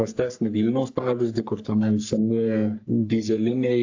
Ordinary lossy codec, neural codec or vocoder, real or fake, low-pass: AAC, 48 kbps; codec, 44.1 kHz, 3.4 kbps, Pupu-Codec; fake; 7.2 kHz